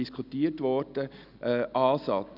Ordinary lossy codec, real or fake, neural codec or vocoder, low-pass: none; real; none; 5.4 kHz